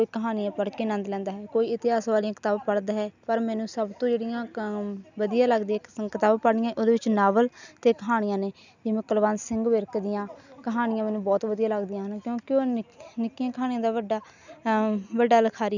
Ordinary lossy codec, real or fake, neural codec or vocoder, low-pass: none; real; none; 7.2 kHz